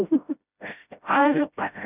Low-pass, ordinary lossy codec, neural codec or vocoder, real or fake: 3.6 kHz; none; codec, 16 kHz, 0.5 kbps, FreqCodec, smaller model; fake